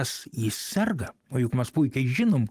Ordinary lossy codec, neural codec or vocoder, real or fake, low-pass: Opus, 24 kbps; none; real; 14.4 kHz